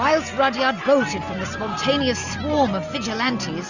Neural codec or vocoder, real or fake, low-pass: none; real; 7.2 kHz